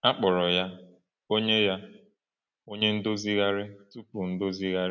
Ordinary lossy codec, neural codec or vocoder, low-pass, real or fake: none; none; 7.2 kHz; real